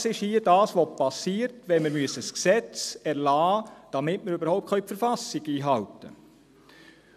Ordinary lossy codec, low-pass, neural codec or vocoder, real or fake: none; 14.4 kHz; none; real